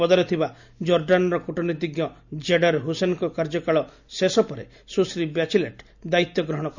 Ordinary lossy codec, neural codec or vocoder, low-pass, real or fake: none; none; 7.2 kHz; real